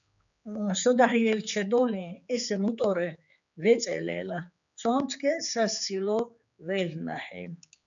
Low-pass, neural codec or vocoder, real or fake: 7.2 kHz; codec, 16 kHz, 4 kbps, X-Codec, HuBERT features, trained on general audio; fake